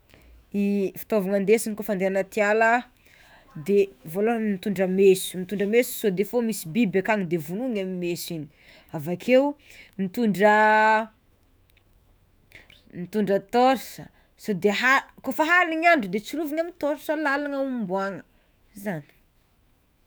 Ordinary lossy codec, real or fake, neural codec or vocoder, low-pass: none; fake; autoencoder, 48 kHz, 128 numbers a frame, DAC-VAE, trained on Japanese speech; none